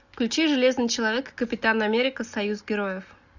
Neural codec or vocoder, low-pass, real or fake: none; 7.2 kHz; real